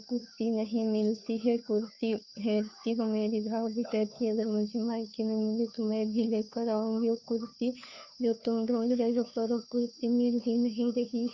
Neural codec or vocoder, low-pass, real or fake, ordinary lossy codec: codec, 16 kHz, 2 kbps, FunCodec, trained on Chinese and English, 25 frames a second; 7.2 kHz; fake; none